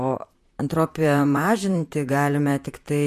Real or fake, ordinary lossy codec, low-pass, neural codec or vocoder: fake; MP3, 96 kbps; 14.4 kHz; vocoder, 44.1 kHz, 128 mel bands, Pupu-Vocoder